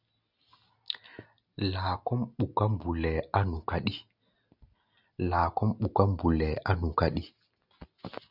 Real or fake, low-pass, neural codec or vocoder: real; 5.4 kHz; none